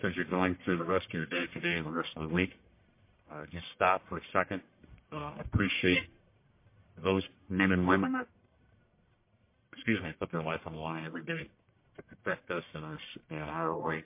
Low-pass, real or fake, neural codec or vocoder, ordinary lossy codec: 3.6 kHz; fake; codec, 44.1 kHz, 1.7 kbps, Pupu-Codec; MP3, 24 kbps